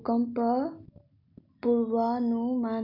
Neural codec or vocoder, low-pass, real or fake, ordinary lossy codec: none; 5.4 kHz; real; none